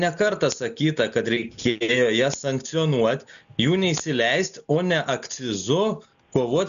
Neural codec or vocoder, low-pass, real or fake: none; 7.2 kHz; real